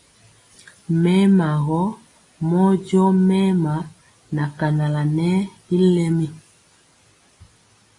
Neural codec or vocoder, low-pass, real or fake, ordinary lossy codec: none; 10.8 kHz; real; AAC, 32 kbps